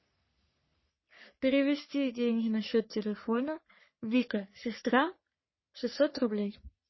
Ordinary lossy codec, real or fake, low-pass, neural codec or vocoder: MP3, 24 kbps; fake; 7.2 kHz; codec, 44.1 kHz, 3.4 kbps, Pupu-Codec